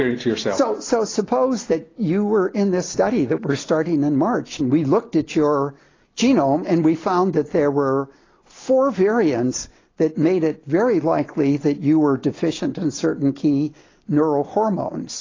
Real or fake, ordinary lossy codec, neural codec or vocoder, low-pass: real; AAC, 32 kbps; none; 7.2 kHz